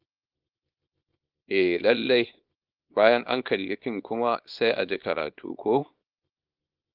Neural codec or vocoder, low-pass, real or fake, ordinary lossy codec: codec, 24 kHz, 0.9 kbps, WavTokenizer, small release; 5.4 kHz; fake; Opus, 32 kbps